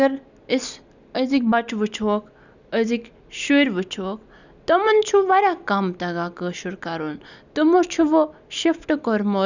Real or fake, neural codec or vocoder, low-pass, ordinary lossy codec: real; none; 7.2 kHz; none